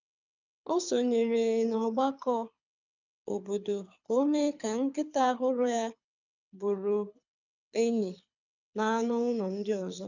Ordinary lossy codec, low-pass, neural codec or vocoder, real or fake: none; 7.2 kHz; codec, 24 kHz, 6 kbps, HILCodec; fake